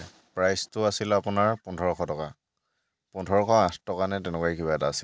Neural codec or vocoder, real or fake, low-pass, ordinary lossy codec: none; real; none; none